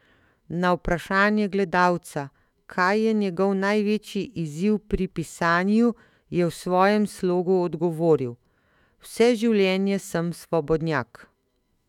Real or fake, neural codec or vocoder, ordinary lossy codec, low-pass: fake; autoencoder, 48 kHz, 128 numbers a frame, DAC-VAE, trained on Japanese speech; none; 19.8 kHz